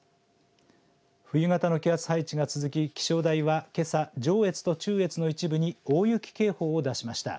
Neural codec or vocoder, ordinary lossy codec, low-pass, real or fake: none; none; none; real